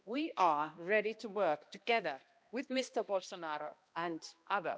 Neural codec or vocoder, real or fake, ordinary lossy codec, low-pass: codec, 16 kHz, 1 kbps, X-Codec, HuBERT features, trained on balanced general audio; fake; none; none